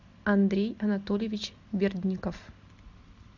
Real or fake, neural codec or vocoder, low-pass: real; none; 7.2 kHz